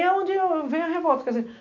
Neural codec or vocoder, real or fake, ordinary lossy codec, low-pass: none; real; none; 7.2 kHz